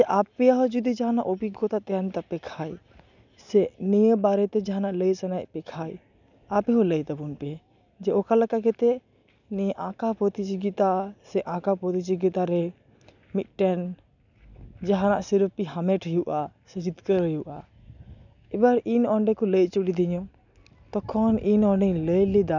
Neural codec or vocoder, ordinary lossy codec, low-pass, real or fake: none; none; 7.2 kHz; real